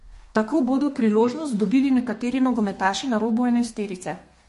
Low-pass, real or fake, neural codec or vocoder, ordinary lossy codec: 14.4 kHz; fake; codec, 32 kHz, 1.9 kbps, SNAC; MP3, 48 kbps